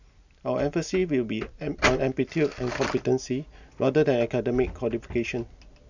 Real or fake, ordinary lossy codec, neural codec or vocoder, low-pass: real; none; none; 7.2 kHz